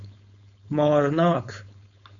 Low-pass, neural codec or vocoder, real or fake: 7.2 kHz; codec, 16 kHz, 4.8 kbps, FACodec; fake